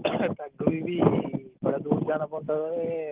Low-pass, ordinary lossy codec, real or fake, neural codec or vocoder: 3.6 kHz; Opus, 16 kbps; real; none